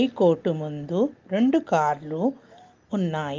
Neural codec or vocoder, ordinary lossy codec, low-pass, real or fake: none; Opus, 32 kbps; 7.2 kHz; real